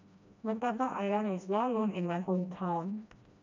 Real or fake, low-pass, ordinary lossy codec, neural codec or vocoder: fake; 7.2 kHz; MP3, 64 kbps; codec, 16 kHz, 1 kbps, FreqCodec, smaller model